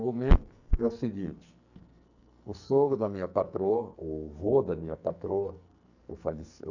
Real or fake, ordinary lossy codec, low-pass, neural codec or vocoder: fake; none; 7.2 kHz; codec, 44.1 kHz, 2.6 kbps, SNAC